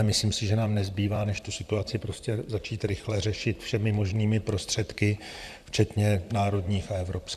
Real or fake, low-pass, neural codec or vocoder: fake; 14.4 kHz; vocoder, 44.1 kHz, 128 mel bands, Pupu-Vocoder